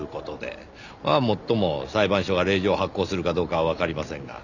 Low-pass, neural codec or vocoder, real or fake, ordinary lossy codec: 7.2 kHz; none; real; none